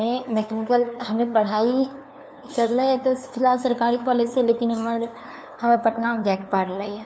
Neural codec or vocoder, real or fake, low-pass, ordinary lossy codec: codec, 16 kHz, 2 kbps, FunCodec, trained on LibriTTS, 25 frames a second; fake; none; none